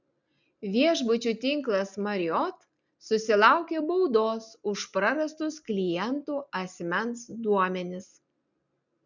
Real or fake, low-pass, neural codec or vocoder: real; 7.2 kHz; none